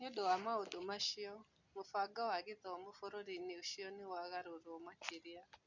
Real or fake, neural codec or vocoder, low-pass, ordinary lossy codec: real; none; 7.2 kHz; none